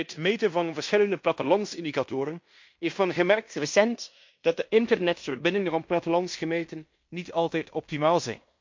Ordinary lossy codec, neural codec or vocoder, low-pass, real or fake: MP3, 48 kbps; codec, 16 kHz in and 24 kHz out, 0.9 kbps, LongCat-Audio-Codec, fine tuned four codebook decoder; 7.2 kHz; fake